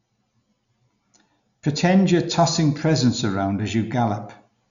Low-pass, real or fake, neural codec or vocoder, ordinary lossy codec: 7.2 kHz; real; none; none